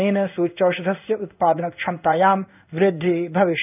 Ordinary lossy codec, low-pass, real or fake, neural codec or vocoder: none; 3.6 kHz; fake; codec, 16 kHz in and 24 kHz out, 1 kbps, XY-Tokenizer